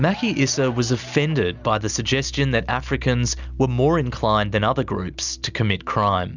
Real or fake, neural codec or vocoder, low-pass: real; none; 7.2 kHz